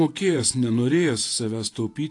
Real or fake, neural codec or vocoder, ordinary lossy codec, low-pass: fake; vocoder, 48 kHz, 128 mel bands, Vocos; AAC, 48 kbps; 10.8 kHz